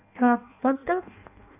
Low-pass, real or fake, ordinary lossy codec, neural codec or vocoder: 3.6 kHz; fake; none; codec, 16 kHz in and 24 kHz out, 0.6 kbps, FireRedTTS-2 codec